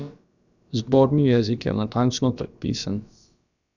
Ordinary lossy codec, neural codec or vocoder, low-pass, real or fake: none; codec, 16 kHz, about 1 kbps, DyCAST, with the encoder's durations; 7.2 kHz; fake